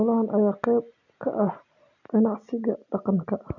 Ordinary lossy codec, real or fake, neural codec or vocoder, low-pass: none; real; none; 7.2 kHz